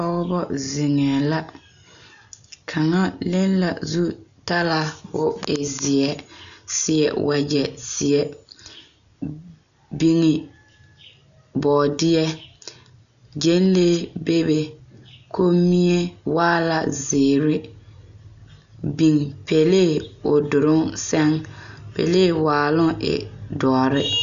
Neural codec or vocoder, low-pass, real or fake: none; 7.2 kHz; real